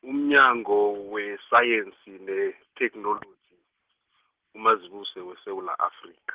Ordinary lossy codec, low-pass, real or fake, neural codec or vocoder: Opus, 16 kbps; 3.6 kHz; real; none